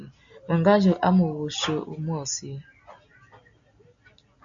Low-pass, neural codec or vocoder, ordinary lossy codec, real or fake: 7.2 kHz; none; MP3, 64 kbps; real